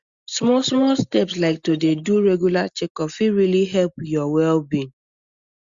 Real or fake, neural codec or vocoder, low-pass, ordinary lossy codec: real; none; 7.2 kHz; Opus, 64 kbps